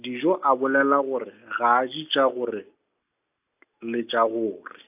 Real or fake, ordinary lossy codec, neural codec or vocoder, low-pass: real; AAC, 32 kbps; none; 3.6 kHz